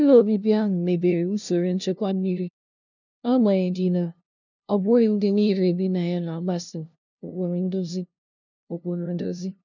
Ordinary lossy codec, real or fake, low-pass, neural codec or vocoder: none; fake; 7.2 kHz; codec, 16 kHz, 0.5 kbps, FunCodec, trained on LibriTTS, 25 frames a second